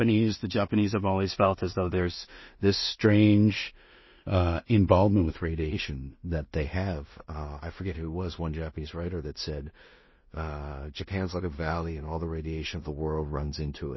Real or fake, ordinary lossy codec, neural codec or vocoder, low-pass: fake; MP3, 24 kbps; codec, 16 kHz in and 24 kHz out, 0.4 kbps, LongCat-Audio-Codec, two codebook decoder; 7.2 kHz